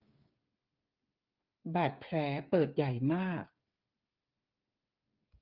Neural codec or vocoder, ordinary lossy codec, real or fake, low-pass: codec, 16 kHz, 8 kbps, FreqCodec, smaller model; Opus, 24 kbps; fake; 5.4 kHz